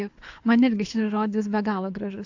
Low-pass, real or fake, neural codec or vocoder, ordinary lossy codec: 7.2 kHz; fake; codec, 24 kHz, 6 kbps, HILCodec; AAC, 48 kbps